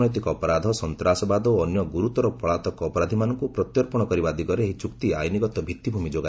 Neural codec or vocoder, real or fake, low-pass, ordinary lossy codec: none; real; none; none